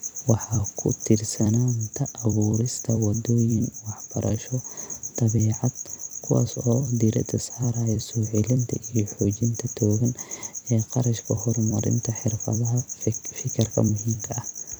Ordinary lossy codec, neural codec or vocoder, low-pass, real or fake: none; vocoder, 44.1 kHz, 128 mel bands every 256 samples, BigVGAN v2; none; fake